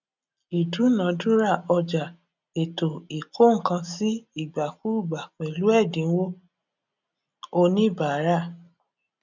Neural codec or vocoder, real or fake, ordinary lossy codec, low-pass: none; real; none; 7.2 kHz